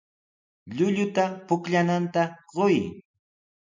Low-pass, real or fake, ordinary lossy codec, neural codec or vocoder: 7.2 kHz; real; MP3, 48 kbps; none